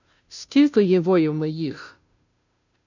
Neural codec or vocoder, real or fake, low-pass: codec, 16 kHz, 0.5 kbps, FunCodec, trained on Chinese and English, 25 frames a second; fake; 7.2 kHz